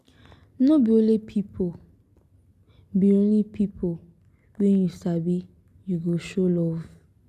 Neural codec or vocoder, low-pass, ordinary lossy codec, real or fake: none; 14.4 kHz; none; real